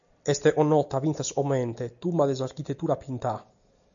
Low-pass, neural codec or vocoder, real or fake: 7.2 kHz; none; real